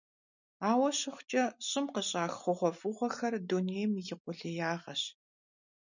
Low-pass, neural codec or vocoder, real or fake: 7.2 kHz; none; real